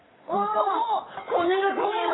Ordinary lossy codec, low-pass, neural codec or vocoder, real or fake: AAC, 16 kbps; 7.2 kHz; vocoder, 22.05 kHz, 80 mel bands, WaveNeXt; fake